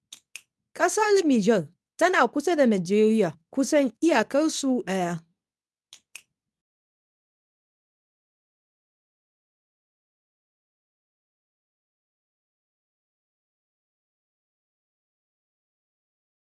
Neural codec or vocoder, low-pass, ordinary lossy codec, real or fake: codec, 24 kHz, 0.9 kbps, WavTokenizer, medium speech release version 2; none; none; fake